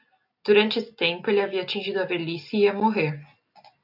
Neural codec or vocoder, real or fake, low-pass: none; real; 5.4 kHz